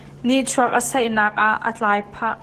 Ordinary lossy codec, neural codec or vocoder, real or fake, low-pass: Opus, 16 kbps; codec, 44.1 kHz, 7.8 kbps, DAC; fake; 14.4 kHz